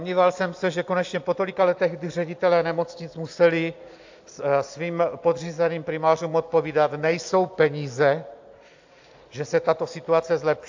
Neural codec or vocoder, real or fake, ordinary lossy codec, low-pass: none; real; AAC, 48 kbps; 7.2 kHz